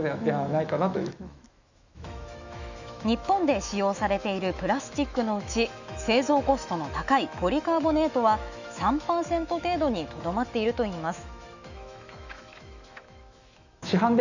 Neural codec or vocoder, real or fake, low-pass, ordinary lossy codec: autoencoder, 48 kHz, 128 numbers a frame, DAC-VAE, trained on Japanese speech; fake; 7.2 kHz; none